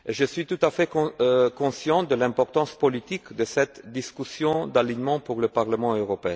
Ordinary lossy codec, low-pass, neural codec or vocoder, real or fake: none; none; none; real